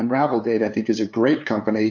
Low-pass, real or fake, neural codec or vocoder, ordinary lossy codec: 7.2 kHz; fake; codec, 16 kHz, 2 kbps, FunCodec, trained on LibriTTS, 25 frames a second; MP3, 48 kbps